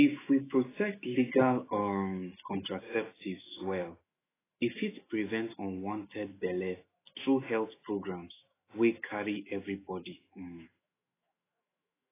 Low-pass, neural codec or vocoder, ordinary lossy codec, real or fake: 3.6 kHz; autoencoder, 48 kHz, 128 numbers a frame, DAC-VAE, trained on Japanese speech; AAC, 16 kbps; fake